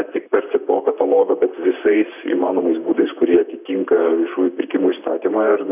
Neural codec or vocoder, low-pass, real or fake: vocoder, 44.1 kHz, 128 mel bands, Pupu-Vocoder; 3.6 kHz; fake